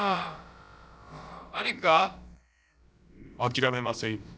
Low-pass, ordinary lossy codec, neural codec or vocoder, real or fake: none; none; codec, 16 kHz, about 1 kbps, DyCAST, with the encoder's durations; fake